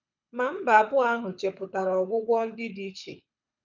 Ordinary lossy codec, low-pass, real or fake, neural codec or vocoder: none; 7.2 kHz; fake; codec, 24 kHz, 6 kbps, HILCodec